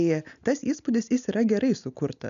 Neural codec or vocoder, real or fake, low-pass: none; real; 7.2 kHz